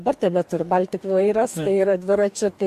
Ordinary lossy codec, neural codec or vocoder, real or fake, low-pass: MP3, 64 kbps; codec, 44.1 kHz, 2.6 kbps, DAC; fake; 14.4 kHz